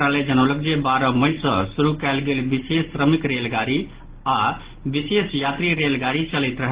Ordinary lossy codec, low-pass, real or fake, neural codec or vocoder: Opus, 16 kbps; 3.6 kHz; real; none